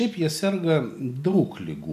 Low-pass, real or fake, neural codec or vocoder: 14.4 kHz; real; none